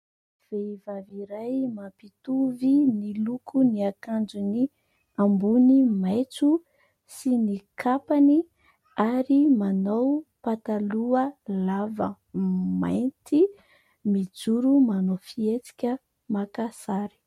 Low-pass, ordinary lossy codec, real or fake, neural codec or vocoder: 19.8 kHz; MP3, 64 kbps; real; none